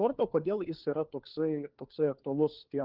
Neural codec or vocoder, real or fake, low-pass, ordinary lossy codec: codec, 16 kHz, 4 kbps, FunCodec, trained on LibriTTS, 50 frames a second; fake; 5.4 kHz; Opus, 16 kbps